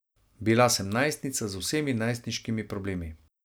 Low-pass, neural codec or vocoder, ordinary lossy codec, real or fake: none; none; none; real